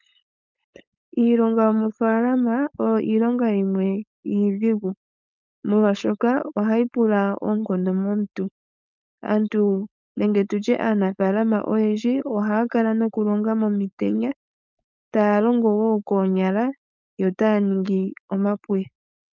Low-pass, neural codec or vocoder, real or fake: 7.2 kHz; codec, 16 kHz, 4.8 kbps, FACodec; fake